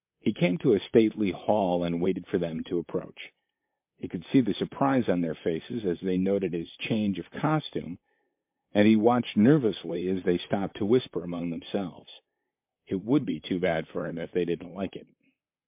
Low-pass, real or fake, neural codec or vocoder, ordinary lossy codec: 3.6 kHz; fake; vocoder, 44.1 kHz, 128 mel bands, Pupu-Vocoder; MP3, 32 kbps